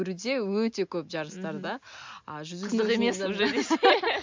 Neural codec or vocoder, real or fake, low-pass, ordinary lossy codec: none; real; 7.2 kHz; MP3, 64 kbps